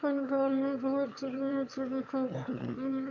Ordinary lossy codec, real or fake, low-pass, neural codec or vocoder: none; fake; 7.2 kHz; autoencoder, 22.05 kHz, a latent of 192 numbers a frame, VITS, trained on one speaker